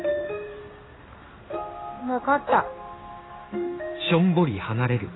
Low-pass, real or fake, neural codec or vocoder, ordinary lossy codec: 7.2 kHz; fake; codec, 16 kHz in and 24 kHz out, 1 kbps, XY-Tokenizer; AAC, 16 kbps